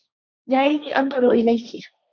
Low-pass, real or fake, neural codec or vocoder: 7.2 kHz; fake; codec, 16 kHz, 1.1 kbps, Voila-Tokenizer